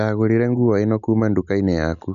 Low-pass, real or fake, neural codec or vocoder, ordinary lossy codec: 7.2 kHz; real; none; none